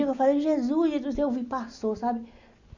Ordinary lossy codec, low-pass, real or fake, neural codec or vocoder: Opus, 64 kbps; 7.2 kHz; real; none